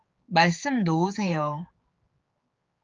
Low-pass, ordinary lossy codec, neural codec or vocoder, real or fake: 7.2 kHz; Opus, 24 kbps; codec, 16 kHz, 4 kbps, X-Codec, HuBERT features, trained on general audio; fake